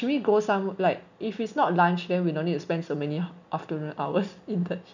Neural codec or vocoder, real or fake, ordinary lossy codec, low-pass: none; real; none; 7.2 kHz